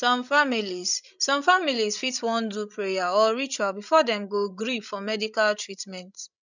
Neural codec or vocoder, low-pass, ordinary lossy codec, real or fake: codec, 16 kHz, 16 kbps, FreqCodec, larger model; 7.2 kHz; none; fake